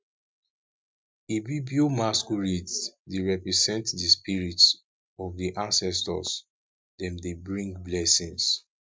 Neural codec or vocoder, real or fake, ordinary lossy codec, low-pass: none; real; none; none